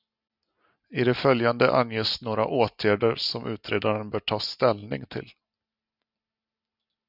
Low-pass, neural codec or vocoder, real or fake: 5.4 kHz; none; real